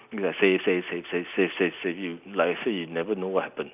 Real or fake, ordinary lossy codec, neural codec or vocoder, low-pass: real; none; none; 3.6 kHz